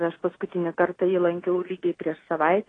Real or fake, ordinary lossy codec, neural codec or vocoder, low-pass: real; AAC, 32 kbps; none; 9.9 kHz